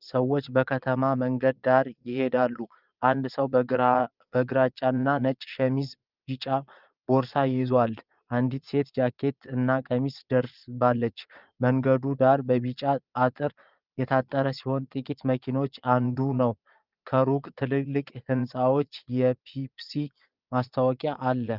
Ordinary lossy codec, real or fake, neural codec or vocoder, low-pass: Opus, 24 kbps; fake; vocoder, 22.05 kHz, 80 mel bands, Vocos; 5.4 kHz